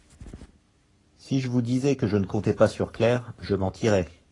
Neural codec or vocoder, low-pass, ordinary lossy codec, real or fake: codec, 44.1 kHz, 7.8 kbps, Pupu-Codec; 10.8 kHz; AAC, 32 kbps; fake